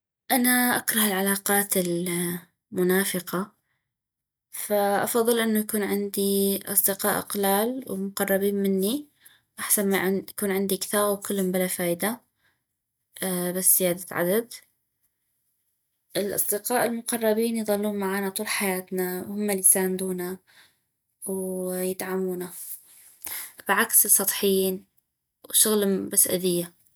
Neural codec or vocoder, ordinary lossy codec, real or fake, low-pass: none; none; real; none